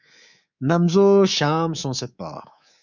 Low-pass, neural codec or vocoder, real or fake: 7.2 kHz; codec, 24 kHz, 3.1 kbps, DualCodec; fake